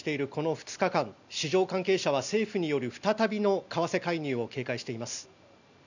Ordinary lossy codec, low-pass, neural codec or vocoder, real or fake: none; 7.2 kHz; none; real